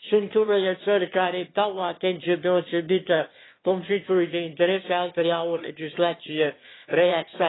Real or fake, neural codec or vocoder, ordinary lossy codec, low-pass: fake; autoencoder, 22.05 kHz, a latent of 192 numbers a frame, VITS, trained on one speaker; AAC, 16 kbps; 7.2 kHz